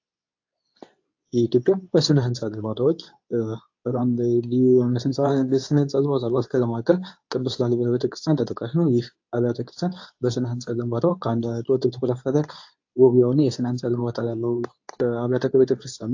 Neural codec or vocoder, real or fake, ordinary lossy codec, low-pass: codec, 24 kHz, 0.9 kbps, WavTokenizer, medium speech release version 2; fake; AAC, 48 kbps; 7.2 kHz